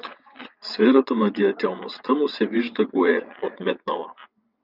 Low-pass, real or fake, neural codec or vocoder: 5.4 kHz; fake; vocoder, 22.05 kHz, 80 mel bands, WaveNeXt